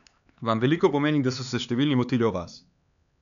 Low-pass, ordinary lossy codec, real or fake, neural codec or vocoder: 7.2 kHz; none; fake; codec, 16 kHz, 4 kbps, X-Codec, HuBERT features, trained on LibriSpeech